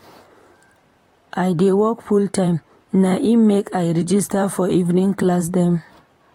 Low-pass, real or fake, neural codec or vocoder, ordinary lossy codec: 19.8 kHz; fake; vocoder, 44.1 kHz, 128 mel bands, Pupu-Vocoder; AAC, 48 kbps